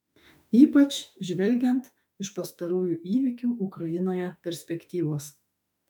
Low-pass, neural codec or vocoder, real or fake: 19.8 kHz; autoencoder, 48 kHz, 32 numbers a frame, DAC-VAE, trained on Japanese speech; fake